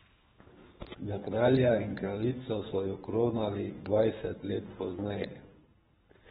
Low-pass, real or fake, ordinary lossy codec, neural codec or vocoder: 10.8 kHz; fake; AAC, 16 kbps; codec, 24 kHz, 3 kbps, HILCodec